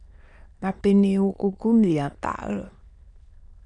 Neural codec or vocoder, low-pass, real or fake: autoencoder, 22.05 kHz, a latent of 192 numbers a frame, VITS, trained on many speakers; 9.9 kHz; fake